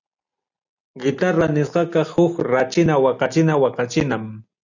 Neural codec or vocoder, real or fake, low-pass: none; real; 7.2 kHz